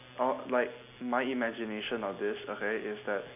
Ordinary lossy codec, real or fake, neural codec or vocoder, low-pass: none; real; none; 3.6 kHz